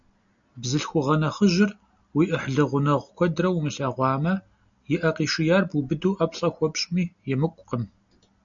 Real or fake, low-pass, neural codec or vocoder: real; 7.2 kHz; none